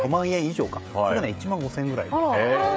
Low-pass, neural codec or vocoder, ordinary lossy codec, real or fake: none; codec, 16 kHz, 16 kbps, FreqCodec, smaller model; none; fake